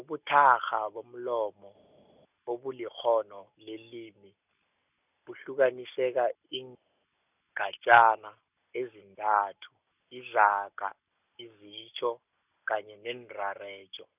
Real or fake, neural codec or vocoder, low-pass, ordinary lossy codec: real; none; 3.6 kHz; none